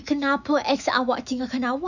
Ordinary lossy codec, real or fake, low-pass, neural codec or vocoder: none; fake; 7.2 kHz; autoencoder, 48 kHz, 128 numbers a frame, DAC-VAE, trained on Japanese speech